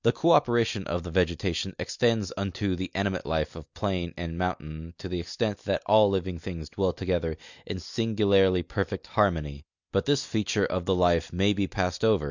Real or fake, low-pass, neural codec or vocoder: real; 7.2 kHz; none